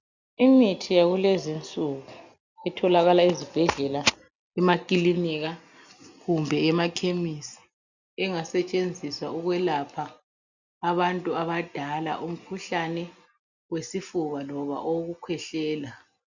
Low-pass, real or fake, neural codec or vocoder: 7.2 kHz; real; none